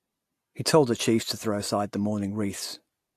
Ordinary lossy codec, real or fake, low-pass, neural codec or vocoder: AAC, 64 kbps; real; 14.4 kHz; none